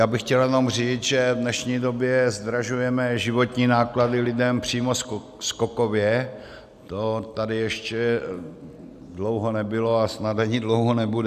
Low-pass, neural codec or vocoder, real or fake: 14.4 kHz; none; real